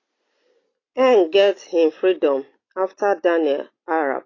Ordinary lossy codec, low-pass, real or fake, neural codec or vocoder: AAC, 32 kbps; 7.2 kHz; real; none